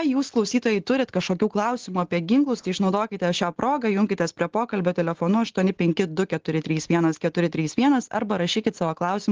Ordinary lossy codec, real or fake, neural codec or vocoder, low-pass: Opus, 16 kbps; real; none; 7.2 kHz